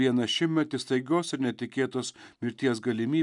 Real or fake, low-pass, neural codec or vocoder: real; 10.8 kHz; none